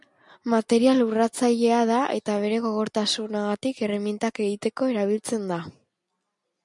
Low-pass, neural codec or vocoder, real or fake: 10.8 kHz; none; real